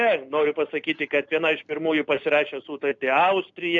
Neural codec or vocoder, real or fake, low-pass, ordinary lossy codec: none; real; 7.2 kHz; AAC, 64 kbps